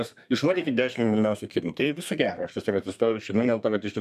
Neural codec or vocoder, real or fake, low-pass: codec, 32 kHz, 1.9 kbps, SNAC; fake; 14.4 kHz